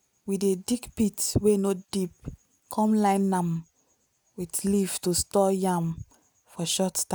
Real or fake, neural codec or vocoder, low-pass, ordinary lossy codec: real; none; none; none